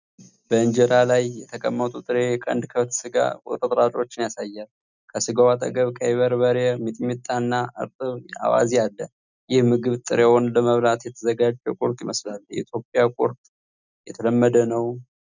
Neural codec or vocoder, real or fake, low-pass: none; real; 7.2 kHz